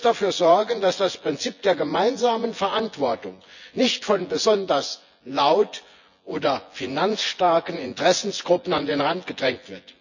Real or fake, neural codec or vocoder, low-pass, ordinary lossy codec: fake; vocoder, 24 kHz, 100 mel bands, Vocos; 7.2 kHz; none